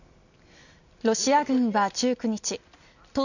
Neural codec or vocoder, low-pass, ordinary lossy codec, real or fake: none; 7.2 kHz; none; real